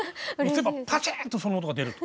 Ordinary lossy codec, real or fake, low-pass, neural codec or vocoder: none; real; none; none